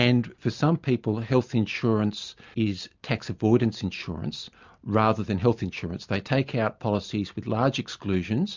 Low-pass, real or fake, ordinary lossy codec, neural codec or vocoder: 7.2 kHz; real; MP3, 64 kbps; none